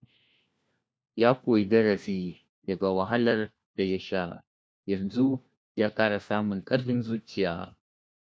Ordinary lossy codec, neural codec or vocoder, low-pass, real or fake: none; codec, 16 kHz, 1 kbps, FunCodec, trained on LibriTTS, 50 frames a second; none; fake